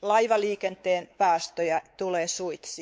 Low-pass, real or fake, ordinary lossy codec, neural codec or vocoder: none; fake; none; codec, 16 kHz, 4 kbps, X-Codec, WavLM features, trained on Multilingual LibriSpeech